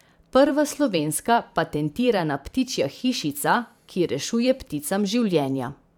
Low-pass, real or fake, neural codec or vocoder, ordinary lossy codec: 19.8 kHz; fake; vocoder, 44.1 kHz, 128 mel bands every 512 samples, BigVGAN v2; none